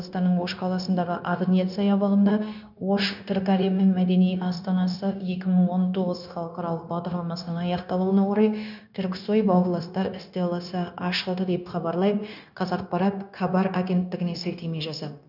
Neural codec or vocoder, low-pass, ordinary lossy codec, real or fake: codec, 16 kHz, 0.9 kbps, LongCat-Audio-Codec; 5.4 kHz; none; fake